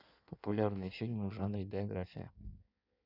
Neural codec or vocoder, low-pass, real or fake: codec, 16 kHz in and 24 kHz out, 1.1 kbps, FireRedTTS-2 codec; 5.4 kHz; fake